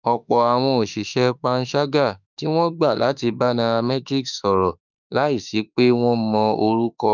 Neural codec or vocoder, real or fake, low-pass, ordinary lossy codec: autoencoder, 48 kHz, 32 numbers a frame, DAC-VAE, trained on Japanese speech; fake; 7.2 kHz; none